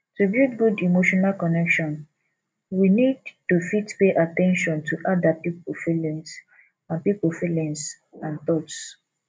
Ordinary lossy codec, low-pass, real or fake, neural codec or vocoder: none; none; real; none